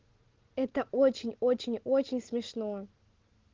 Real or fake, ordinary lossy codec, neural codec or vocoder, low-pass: real; Opus, 16 kbps; none; 7.2 kHz